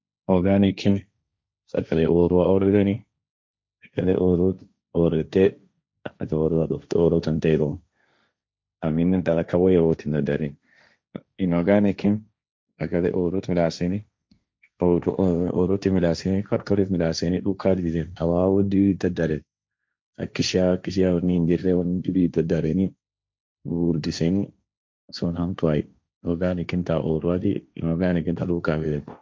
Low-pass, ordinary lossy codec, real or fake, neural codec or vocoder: none; none; fake; codec, 16 kHz, 1.1 kbps, Voila-Tokenizer